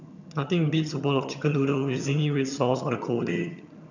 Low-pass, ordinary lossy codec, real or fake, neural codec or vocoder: 7.2 kHz; none; fake; vocoder, 22.05 kHz, 80 mel bands, HiFi-GAN